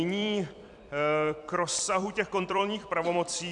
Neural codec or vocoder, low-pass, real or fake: vocoder, 44.1 kHz, 128 mel bands every 256 samples, BigVGAN v2; 10.8 kHz; fake